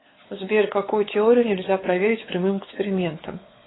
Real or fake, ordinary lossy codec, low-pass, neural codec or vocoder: fake; AAC, 16 kbps; 7.2 kHz; codec, 16 kHz, 4 kbps, X-Codec, WavLM features, trained on Multilingual LibriSpeech